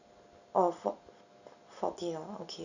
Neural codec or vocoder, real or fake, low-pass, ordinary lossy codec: none; real; 7.2 kHz; none